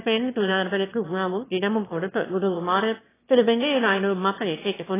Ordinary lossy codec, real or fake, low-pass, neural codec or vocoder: AAC, 16 kbps; fake; 3.6 kHz; autoencoder, 22.05 kHz, a latent of 192 numbers a frame, VITS, trained on one speaker